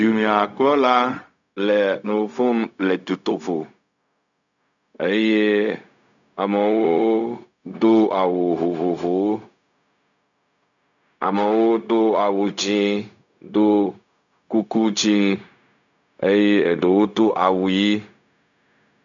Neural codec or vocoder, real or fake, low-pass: codec, 16 kHz, 0.4 kbps, LongCat-Audio-Codec; fake; 7.2 kHz